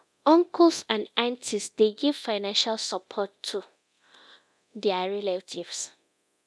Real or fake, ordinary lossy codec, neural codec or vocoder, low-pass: fake; none; codec, 24 kHz, 0.9 kbps, DualCodec; none